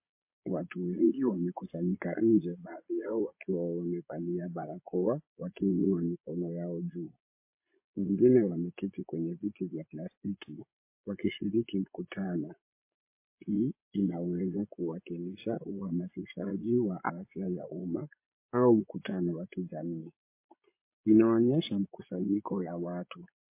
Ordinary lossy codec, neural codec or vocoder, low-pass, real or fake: MP3, 24 kbps; vocoder, 22.05 kHz, 80 mel bands, Vocos; 3.6 kHz; fake